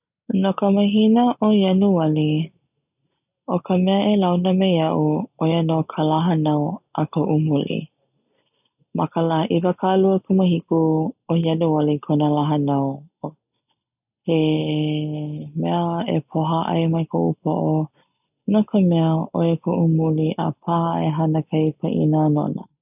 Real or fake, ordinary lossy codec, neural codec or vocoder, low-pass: real; none; none; 3.6 kHz